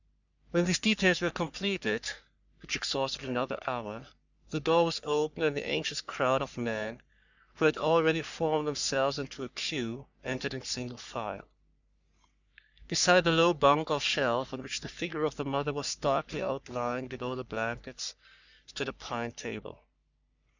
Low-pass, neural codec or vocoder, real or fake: 7.2 kHz; codec, 44.1 kHz, 3.4 kbps, Pupu-Codec; fake